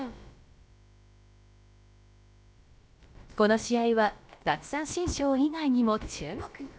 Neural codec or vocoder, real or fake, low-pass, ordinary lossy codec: codec, 16 kHz, about 1 kbps, DyCAST, with the encoder's durations; fake; none; none